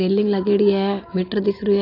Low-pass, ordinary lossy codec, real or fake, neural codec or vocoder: 5.4 kHz; AAC, 32 kbps; real; none